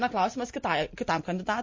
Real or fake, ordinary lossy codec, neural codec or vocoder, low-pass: real; MP3, 32 kbps; none; 7.2 kHz